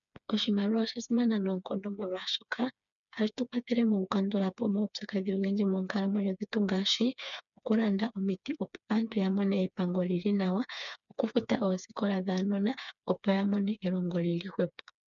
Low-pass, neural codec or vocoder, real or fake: 7.2 kHz; codec, 16 kHz, 4 kbps, FreqCodec, smaller model; fake